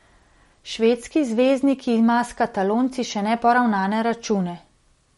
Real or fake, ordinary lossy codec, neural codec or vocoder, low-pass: real; MP3, 48 kbps; none; 19.8 kHz